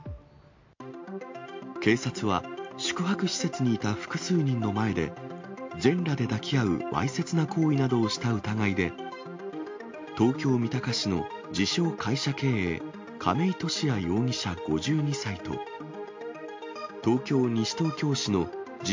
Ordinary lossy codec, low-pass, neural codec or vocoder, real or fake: MP3, 48 kbps; 7.2 kHz; none; real